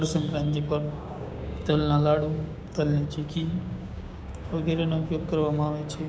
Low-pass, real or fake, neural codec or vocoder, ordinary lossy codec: none; fake; codec, 16 kHz, 6 kbps, DAC; none